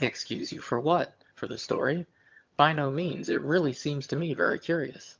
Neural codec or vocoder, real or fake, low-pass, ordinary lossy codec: vocoder, 22.05 kHz, 80 mel bands, HiFi-GAN; fake; 7.2 kHz; Opus, 24 kbps